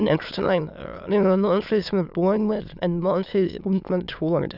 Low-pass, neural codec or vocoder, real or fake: 5.4 kHz; autoencoder, 22.05 kHz, a latent of 192 numbers a frame, VITS, trained on many speakers; fake